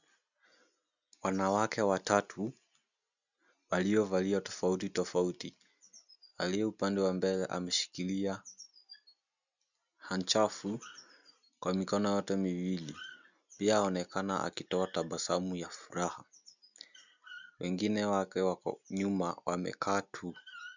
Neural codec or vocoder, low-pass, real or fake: none; 7.2 kHz; real